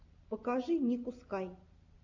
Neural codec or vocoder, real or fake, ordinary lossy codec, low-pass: none; real; AAC, 48 kbps; 7.2 kHz